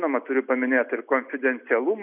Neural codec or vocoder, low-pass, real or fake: none; 3.6 kHz; real